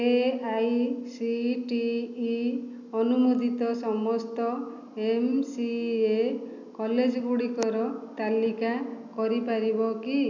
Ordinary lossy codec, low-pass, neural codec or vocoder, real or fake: none; 7.2 kHz; none; real